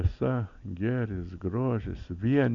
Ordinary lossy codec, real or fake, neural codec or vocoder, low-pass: MP3, 64 kbps; real; none; 7.2 kHz